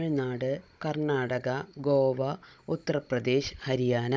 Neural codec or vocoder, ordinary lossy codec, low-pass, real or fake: codec, 16 kHz, 16 kbps, FunCodec, trained on Chinese and English, 50 frames a second; none; none; fake